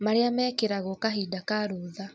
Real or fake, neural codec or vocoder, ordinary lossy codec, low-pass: real; none; none; none